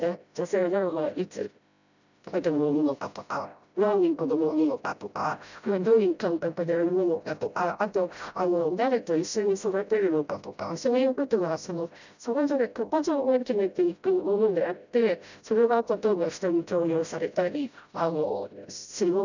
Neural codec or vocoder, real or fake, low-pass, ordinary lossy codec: codec, 16 kHz, 0.5 kbps, FreqCodec, smaller model; fake; 7.2 kHz; none